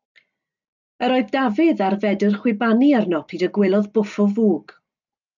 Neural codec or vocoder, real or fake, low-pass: none; real; 7.2 kHz